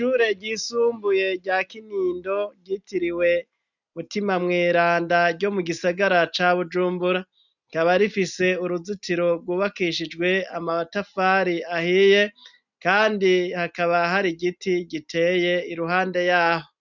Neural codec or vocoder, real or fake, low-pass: none; real; 7.2 kHz